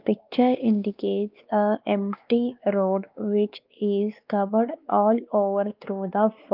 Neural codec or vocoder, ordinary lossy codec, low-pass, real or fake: codec, 16 kHz, 2 kbps, X-Codec, WavLM features, trained on Multilingual LibriSpeech; Opus, 24 kbps; 5.4 kHz; fake